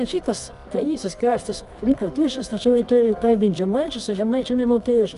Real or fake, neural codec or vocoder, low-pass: fake; codec, 24 kHz, 0.9 kbps, WavTokenizer, medium music audio release; 10.8 kHz